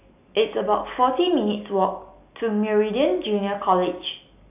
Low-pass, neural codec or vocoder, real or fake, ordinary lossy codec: 3.6 kHz; none; real; AAC, 32 kbps